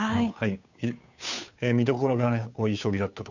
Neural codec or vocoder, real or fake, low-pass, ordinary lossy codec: codec, 16 kHz, 2 kbps, FunCodec, trained on Chinese and English, 25 frames a second; fake; 7.2 kHz; none